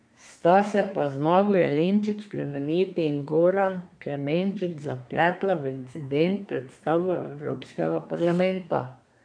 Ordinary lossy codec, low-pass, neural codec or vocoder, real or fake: none; 9.9 kHz; codec, 24 kHz, 1 kbps, SNAC; fake